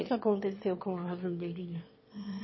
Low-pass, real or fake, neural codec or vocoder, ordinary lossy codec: 7.2 kHz; fake; autoencoder, 22.05 kHz, a latent of 192 numbers a frame, VITS, trained on one speaker; MP3, 24 kbps